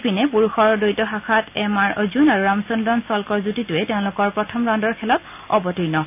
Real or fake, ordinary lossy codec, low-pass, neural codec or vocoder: real; none; 3.6 kHz; none